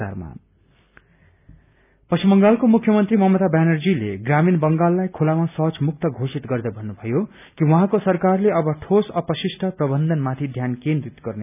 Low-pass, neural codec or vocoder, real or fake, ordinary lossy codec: 3.6 kHz; none; real; none